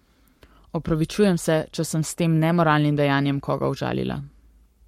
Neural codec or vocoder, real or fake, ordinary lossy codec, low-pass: codec, 44.1 kHz, 7.8 kbps, Pupu-Codec; fake; MP3, 64 kbps; 19.8 kHz